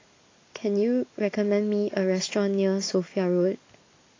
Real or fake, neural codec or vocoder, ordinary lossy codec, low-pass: real; none; AAC, 32 kbps; 7.2 kHz